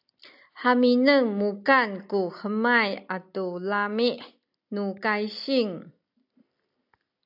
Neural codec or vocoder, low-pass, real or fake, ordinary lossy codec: none; 5.4 kHz; real; AAC, 48 kbps